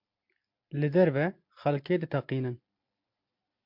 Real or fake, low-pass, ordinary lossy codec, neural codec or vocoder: real; 5.4 kHz; MP3, 48 kbps; none